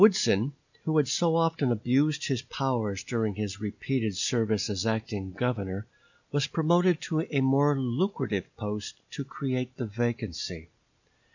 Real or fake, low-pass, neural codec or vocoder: real; 7.2 kHz; none